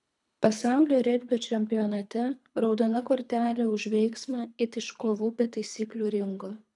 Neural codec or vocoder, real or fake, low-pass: codec, 24 kHz, 3 kbps, HILCodec; fake; 10.8 kHz